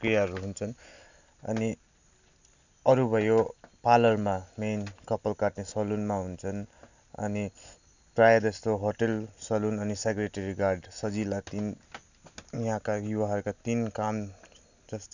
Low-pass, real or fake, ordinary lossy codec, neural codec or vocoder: 7.2 kHz; real; none; none